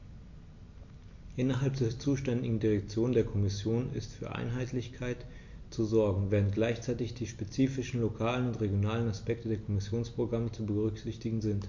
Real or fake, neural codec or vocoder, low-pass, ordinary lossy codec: real; none; 7.2 kHz; MP3, 48 kbps